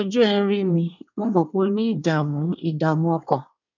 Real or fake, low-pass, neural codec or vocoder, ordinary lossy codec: fake; 7.2 kHz; codec, 24 kHz, 1 kbps, SNAC; none